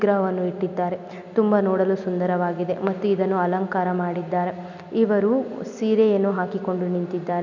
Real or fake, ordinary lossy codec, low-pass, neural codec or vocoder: real; none; 7.2 kHz; none